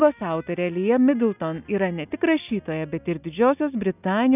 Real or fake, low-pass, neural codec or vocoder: real; 3.6 kHz; none